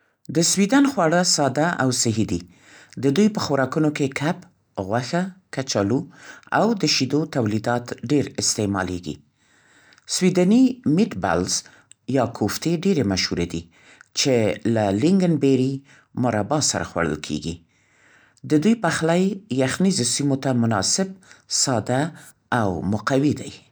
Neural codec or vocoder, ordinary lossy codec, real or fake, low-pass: none; none; real; none